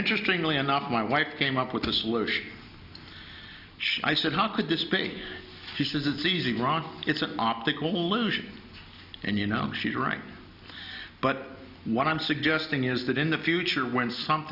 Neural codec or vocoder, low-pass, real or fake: none; 5.4 kHz; real